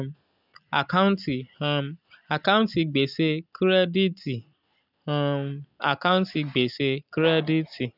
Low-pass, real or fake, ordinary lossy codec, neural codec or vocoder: 5.4 kHz; real; none; none